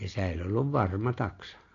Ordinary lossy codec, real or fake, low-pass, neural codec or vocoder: AAC, 32 kbps; real; 7.2 kHz; none